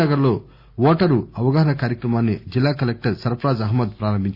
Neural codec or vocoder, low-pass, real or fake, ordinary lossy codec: none; 5.4 kHz; real; Opus, 64 kbps